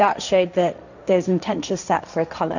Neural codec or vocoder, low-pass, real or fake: codec, 16 kHz, 1.1 kbps, Voila-Tokenizer; 7.2 kHz; fake